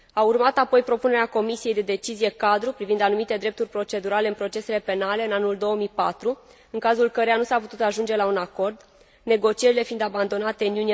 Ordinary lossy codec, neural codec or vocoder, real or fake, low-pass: none; none; real; none